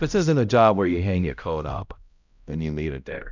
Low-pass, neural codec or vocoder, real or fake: 7.2 kHz; codec, 16 kHz, 0.5 kbps, X-Codec, HuBERT features, trained on balanced general audio; fake